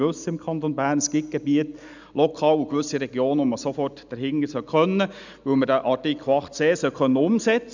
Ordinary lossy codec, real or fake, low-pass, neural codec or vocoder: none; real; 7.2 kHz; none